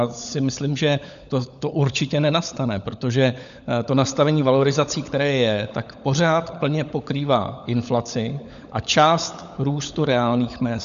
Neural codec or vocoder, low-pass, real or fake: codec, 16 kHz, 16 kbps, FunCodec, trained on LibriTTS, 50 frames a second; 7.2 kHz; fake